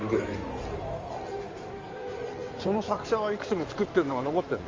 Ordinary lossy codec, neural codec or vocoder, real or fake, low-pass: Opus, 32 kbps; codec, 16 kHz in and 24 kHz out, 2.2 kbps, FireRedTTS-2 codec; fake; 7.2 kHz